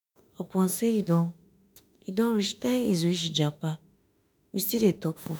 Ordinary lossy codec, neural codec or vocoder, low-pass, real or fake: none; autoencoder, 48 kHz, 32 numbers a frame, DAC-VAE, trained on Japanese speech; none; fake